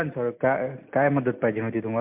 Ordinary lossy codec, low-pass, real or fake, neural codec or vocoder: MP3, 32 kbps; 3.6 kHz; real; none